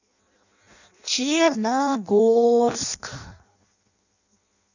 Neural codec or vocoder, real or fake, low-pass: codec, 16 kHz in and 24 kHz out, 0.6 kbps, FireRedTTS-2 codec; fake; 7.2 kHz